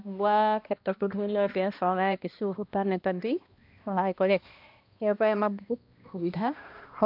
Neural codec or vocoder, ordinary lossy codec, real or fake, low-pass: codec, 16 kHz, 1 kbps, X-Codec, HuBERT features, trained on balanced general audio; MP3, 48 kbps; fake; 5.4 kHz